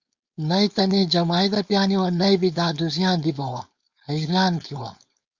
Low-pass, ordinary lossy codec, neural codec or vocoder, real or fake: 7.2 kHz; Opus, 64 kbps; codec, 16 kHz, 4.8 kbps, FACodec; fake